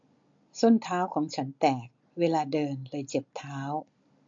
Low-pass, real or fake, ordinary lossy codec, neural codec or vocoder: 7.2 kHz; real; MP3, 48 kbps; none